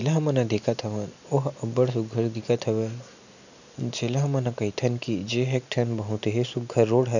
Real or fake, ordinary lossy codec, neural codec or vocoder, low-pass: real; none; none; 7.2 kHz